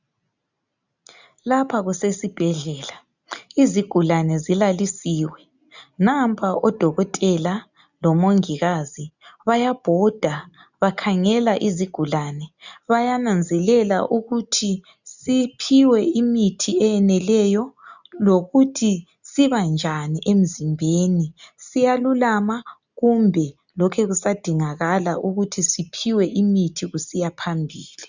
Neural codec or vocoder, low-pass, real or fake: none; 7.2 kHz; real